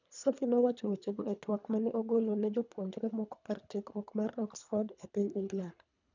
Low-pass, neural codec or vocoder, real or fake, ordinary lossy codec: 7.2 kHz; codec, 24 kHz, 3 kbps, HILCodec; fake; none